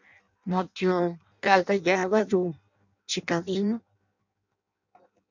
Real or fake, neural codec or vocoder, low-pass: fake; codec, 16 kHz in and 24 kHz out, 0.6 kbps, FireRedTTS-2 codec; 7.2 kHz